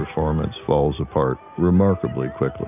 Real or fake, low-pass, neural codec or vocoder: real; 3.6 kHz; none